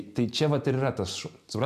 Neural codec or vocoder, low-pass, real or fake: none; 14.4 kHz; real